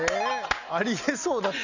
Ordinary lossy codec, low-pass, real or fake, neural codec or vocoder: none; 7.2 kHz; real; none